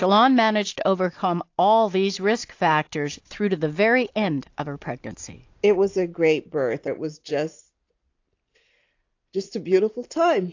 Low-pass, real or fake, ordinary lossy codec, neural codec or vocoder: 7.2 kHz; real; AAC, 48 kbps; none